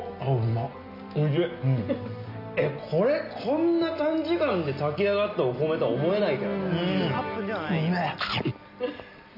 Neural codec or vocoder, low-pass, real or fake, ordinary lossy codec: none; 5.4 kHz; real; none